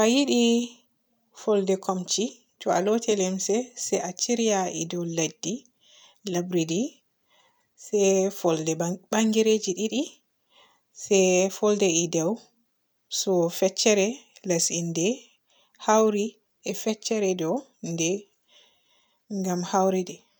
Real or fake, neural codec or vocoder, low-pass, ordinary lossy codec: real; none; none; none